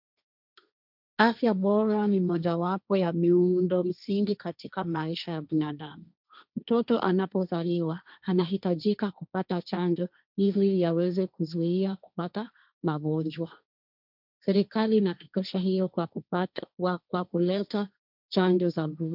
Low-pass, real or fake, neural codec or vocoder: 5.4 kHz; fake; codec, 16 kHz, 1.1 kbps, Voila-Tokenizer